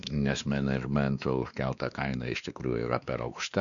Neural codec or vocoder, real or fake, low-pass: codec, 16 kHz, 2 kbps, X-Codec, WavLM features, trained on Multilingual LibriSpeech; fake; 7.2 kHz